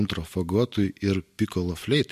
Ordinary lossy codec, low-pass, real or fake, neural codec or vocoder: MP3, 64 kbps; 14.4 kHz; real; none